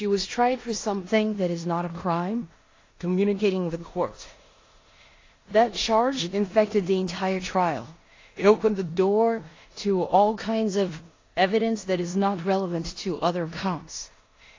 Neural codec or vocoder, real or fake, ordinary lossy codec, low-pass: codec, 16 kHz in and 24 kHz out, 0.9 kbps, LongCat-Audio-Codec, four codebook decoder; fake; AAC, 32 kbps; 7.2 kHz